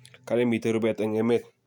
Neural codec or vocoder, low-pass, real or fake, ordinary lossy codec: none; 19.8 kHz; real; none